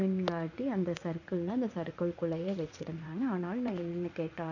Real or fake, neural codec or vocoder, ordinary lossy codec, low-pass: fake; vocoder, 44.1 kHz, 80 mel bands, Vocos; none; 7.2 kHz